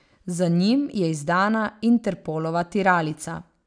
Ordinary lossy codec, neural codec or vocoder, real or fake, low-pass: none; none; real; 9.9 kHz